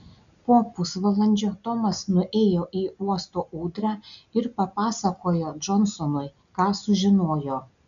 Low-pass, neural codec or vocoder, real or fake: 7.2 kHz; none; real